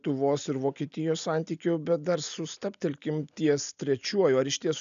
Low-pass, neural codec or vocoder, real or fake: 7.2 kHz; none; real